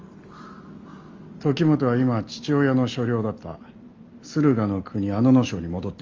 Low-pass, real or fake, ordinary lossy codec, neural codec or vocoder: 7.2 kHz; real; Opus, 32 kbps; none